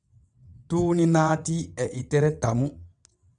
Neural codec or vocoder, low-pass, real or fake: vocoder, 22.05 kHz, 80 mel bands, WaveNeXt; 9.9 kHz; fake